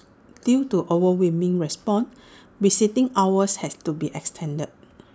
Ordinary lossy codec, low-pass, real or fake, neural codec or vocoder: none; none; real; none